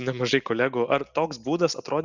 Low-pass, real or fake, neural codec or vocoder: 7.2 kHz; real; none